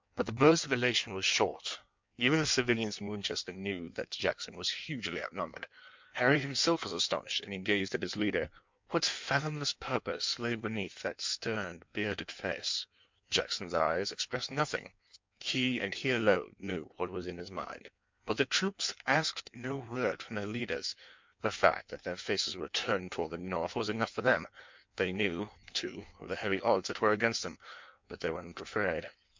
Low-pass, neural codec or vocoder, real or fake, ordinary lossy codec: 7.2 kHz; codec, 16 kHz in and 24 kHz out, 1.1 kbps, FireRedTTS-2 codec; fake; MP3, 64 kbps